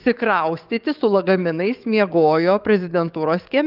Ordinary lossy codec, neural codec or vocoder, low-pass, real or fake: Opus, 32 kbps; codec, 44.1 kHz, 7.8 kbps, DAC; 5.4 kHz; fake